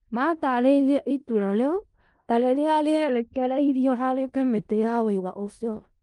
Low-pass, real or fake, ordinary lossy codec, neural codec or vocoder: 10.8 kHz; fake; Opus, 32 kbps; codec, 16 kHz in and 24 kHz out, 0.4 kbps, LongCat-Audio-Codec, four codebook decoder